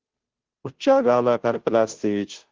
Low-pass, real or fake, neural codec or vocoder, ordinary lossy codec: 7.2 kHz; fake; codec, 16 kHz, 0.5 kbps, FunCodec, trained on Chinese and English, 25 frames a second; Opus, 16 kbps